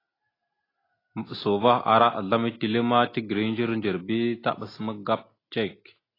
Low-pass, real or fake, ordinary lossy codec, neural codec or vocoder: 5.4 kHz; real; AAC, 24 kbps; none